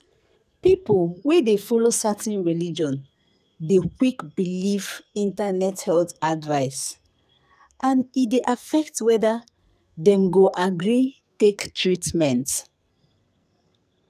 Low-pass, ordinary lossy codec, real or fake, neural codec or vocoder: 14.4 kHz; none; fake; codec, 44.1 kHz, 2.6 kbps, SNAC